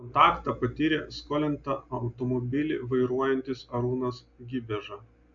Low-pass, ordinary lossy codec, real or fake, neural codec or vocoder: 7.2 kHz; AAC, 64 kbps; real; none